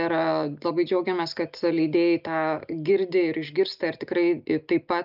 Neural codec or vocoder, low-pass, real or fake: none; 5.4 kHz; real